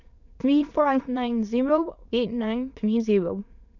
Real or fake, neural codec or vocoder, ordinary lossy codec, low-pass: fake; autoencoder, 22.05 kHz, a latent of 192 numbers a frame, VITS, trained on many speakers; none; 7.2 kHz